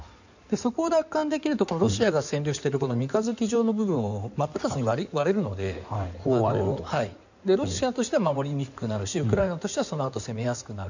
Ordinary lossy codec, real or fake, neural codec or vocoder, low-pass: none; fake; codec, 16 kHz in and 24 kHz out, 2.2 kbps, FireRedTTS-2 codec; 7.2 kHz